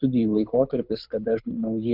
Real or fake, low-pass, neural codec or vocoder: fake; 5.4 kHz; codec, 24 kHz, 6 kbps, HILCodec